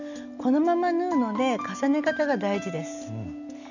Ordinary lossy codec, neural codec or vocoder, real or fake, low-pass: none; none; real; 7.2 kHz